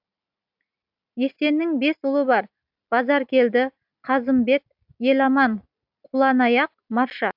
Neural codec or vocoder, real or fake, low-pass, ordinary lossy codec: none; real; 5.4 kHz; AAC, 48 kbps